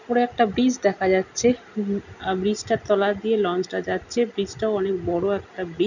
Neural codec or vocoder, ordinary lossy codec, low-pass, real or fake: none; none; 7.2 kHz; real